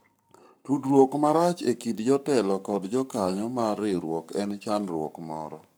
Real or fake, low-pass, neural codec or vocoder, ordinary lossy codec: fake; none; codec, 44.1 kHz, 7.8 kbps, Pupu-Codec; none